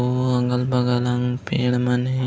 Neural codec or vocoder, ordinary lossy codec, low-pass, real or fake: none; none; none; real